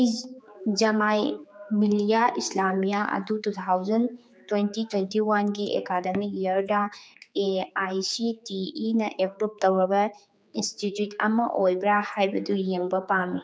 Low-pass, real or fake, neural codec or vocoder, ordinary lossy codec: none; fake; codec, 16 kHz, 4 kbps, X-Codec, HuBERT features, trained on general audio; none